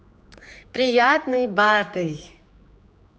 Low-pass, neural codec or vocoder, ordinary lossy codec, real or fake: none; codec, 16 kHz, 4 kbps, X-Codec, HuBERT features, trained on general audio; none; fake